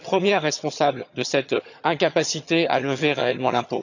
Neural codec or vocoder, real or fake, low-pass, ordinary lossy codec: vocoder, 22.05 kHz, 80 mel bands, HiFi-GAN; fake; 7.2 kHz; none